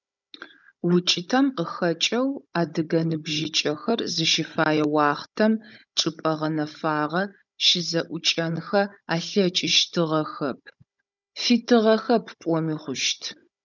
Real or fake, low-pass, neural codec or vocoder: fake; 7.2 kHz; codec, 16 kHz, 16 kbps, FunCodec, trained on Chinese and English, 50 frames a second